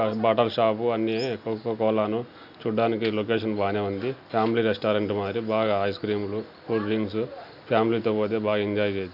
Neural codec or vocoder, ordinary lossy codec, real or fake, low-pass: none; none; real; 5.4 kHz